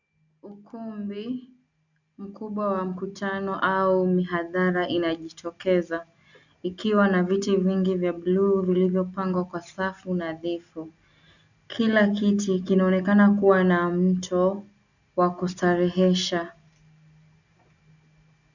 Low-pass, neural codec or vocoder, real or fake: 7.2 kHz; none; real